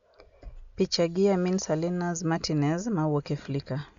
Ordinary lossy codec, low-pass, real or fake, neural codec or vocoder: Opus, 64 kbps; 7.2 kHz; real; none